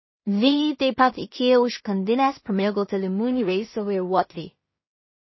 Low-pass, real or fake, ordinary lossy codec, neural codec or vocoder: 7.2 kHz; fake; MP3, 24 kbps; codec, 16 kHz in and 24 kHz out, 0.4 kbps, LongCat-Audio-Codec, two codebook decoder